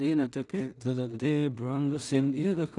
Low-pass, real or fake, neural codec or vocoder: 10.8 kHz; fake; codec, 16 kHz in and 24 kHz out, 0.4 kbps, LongCat-Audio-Codec, two codebook decoder